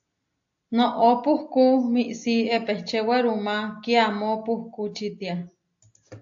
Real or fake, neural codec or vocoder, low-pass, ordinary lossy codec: real; none; 7.2 kHz; AAC, 64 kbps